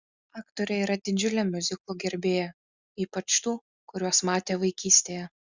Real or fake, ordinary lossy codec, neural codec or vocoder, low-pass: real; Opus, 64 kbps; none; 7.2 kHz